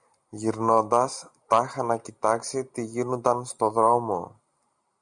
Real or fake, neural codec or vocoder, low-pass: real; none; 10.8 kHz